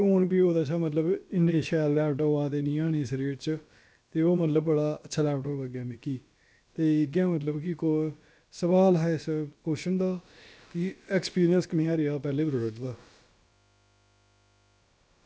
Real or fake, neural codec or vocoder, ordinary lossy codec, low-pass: fake; codec, 16 kHz, about 1 kbps, DyCAST, with the encoder's durations; none; none